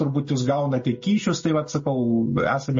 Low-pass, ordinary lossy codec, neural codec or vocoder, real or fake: 7.2 kHz; MP3, 32 kbps; none; real